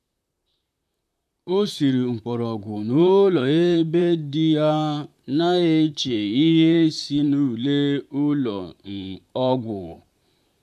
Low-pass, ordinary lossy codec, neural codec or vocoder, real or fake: 14.4 kHz; none; vocoder, 44.1 kHz, 128 mel bands, Pupu-Vocoder; fake